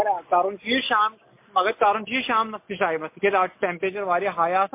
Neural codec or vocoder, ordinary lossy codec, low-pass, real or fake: none; MP3, 24 kbps; 3.6 kHz; real